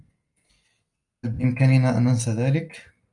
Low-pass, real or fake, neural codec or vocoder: 10.8 kHz; real; none